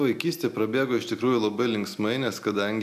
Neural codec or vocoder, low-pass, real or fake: none; 14.4 kHz; real